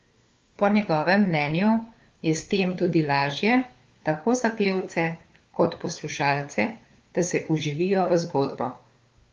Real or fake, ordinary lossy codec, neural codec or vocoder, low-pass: fake; Opus, 32 kbps; codec, 16 kHz, 4 kbps, FunCodec, trained on LibriTTS, 50 frames a second; 7.2 kHz